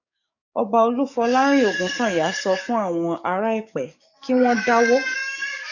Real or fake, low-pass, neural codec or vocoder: fake; 7.2 kHz; codec, 44.1 kHz, 7.8 kbps, DAC